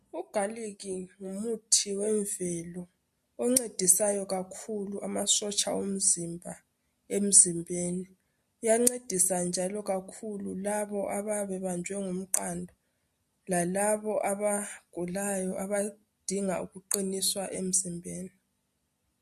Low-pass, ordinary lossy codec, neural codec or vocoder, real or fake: 14.4 kHz; MP3, 64 kbps; none; real